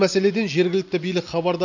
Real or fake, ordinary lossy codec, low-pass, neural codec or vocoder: real; none; 7.2 kHz; none